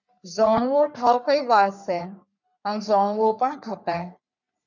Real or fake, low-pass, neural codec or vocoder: fake; 7.2 kHz; codec, 44.1 kHz, 3.4 kbps, Pupu-Codec